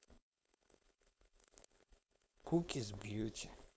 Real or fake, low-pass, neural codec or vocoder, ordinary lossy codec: fake; none; codec, 16 kHz, 4.8 kbps, FACodec; none